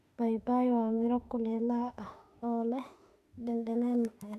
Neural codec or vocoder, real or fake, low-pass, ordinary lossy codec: autoencoder, 48 kHz, 32 numbers a frame, DAC-VAE, trained on Japanese speech; fake; 14.4 kHz; Opus, 64 kbps